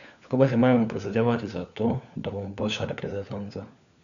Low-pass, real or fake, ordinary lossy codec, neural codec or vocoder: 7.2 kHz; fake; none; codec, 16 kHz, 4 kbps, FunCodec, trained on LibriTTS, 50 frames a second